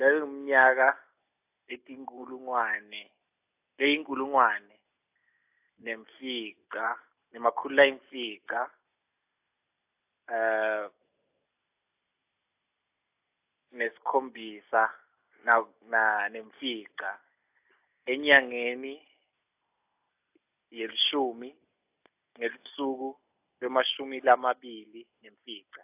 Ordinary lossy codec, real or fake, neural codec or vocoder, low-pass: AAC, 32 kbps; real; none; 3.6 kHz